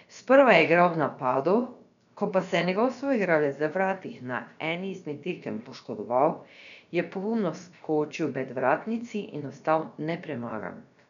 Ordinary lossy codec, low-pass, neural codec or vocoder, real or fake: none; 7.2 kHz; codec, 16 kHz, about 1 kbps, DyCAST, with the encoder's durations; fake